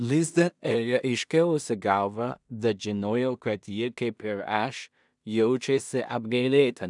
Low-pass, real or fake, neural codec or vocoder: 10.8 kHz; fake; codec, 16 kHz in and 24 kHz out, 0.4 kbps, LongCat-Audio-Codec, two codebook decoder